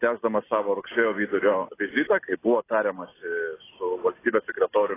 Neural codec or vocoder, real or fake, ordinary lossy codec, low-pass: none; real; AAC, 16 kbps; 3.6 kHz